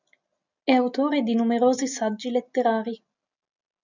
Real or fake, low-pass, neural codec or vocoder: real; 7.2 kHz; none